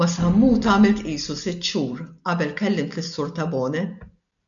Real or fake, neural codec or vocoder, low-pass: real; none; 7.2 kHz